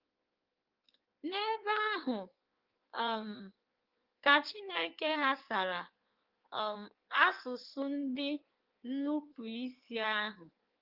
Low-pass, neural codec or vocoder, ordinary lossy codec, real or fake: 5.4 kHz; codec, 16 kHz in and 24 kHz out, 1.1 kbps, FireRedTTS-2 codec; Opus, 32 kbps; fake